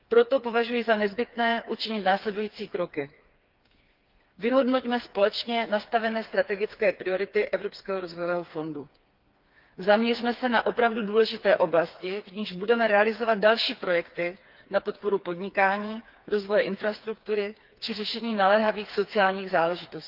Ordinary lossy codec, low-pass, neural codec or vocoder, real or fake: Opus, 32 kbps; 5.4 kHz; codec, 16 kHz, 4 kbps, FreqCodec, smaller model; fake